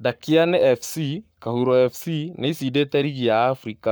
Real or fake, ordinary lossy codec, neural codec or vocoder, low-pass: fake; none; codec, 44.1 kHz, 7.8 kbps, Pupu-Codec; none